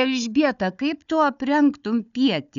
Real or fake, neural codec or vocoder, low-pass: fake; codec, 16 kHz, 4 kbps, FunCodec, trained on Chinese and English, 50 frames a second; 7.2 kHz